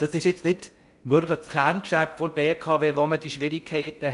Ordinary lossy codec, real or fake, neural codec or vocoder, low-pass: none; fake; codec, 16 kHz in and 24 kHz out, 0.6 kbps, FocalCodec, streaming, 4096 codes; 10.8 kHz